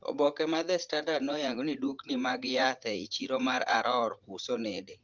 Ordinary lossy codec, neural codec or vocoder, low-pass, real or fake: Opus, 24 kbps; vocoder, 44.1 kHz, 80 mel bands, Vocos; 7.2 kHz; fake